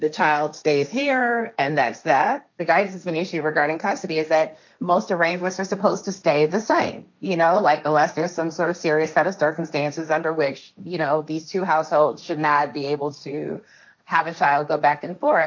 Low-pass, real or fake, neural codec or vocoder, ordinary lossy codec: 7.2 kHz; fake; codec, 16 kHz, 1.1 kbps, Voila-Tokenizer; MP3, 64 kbps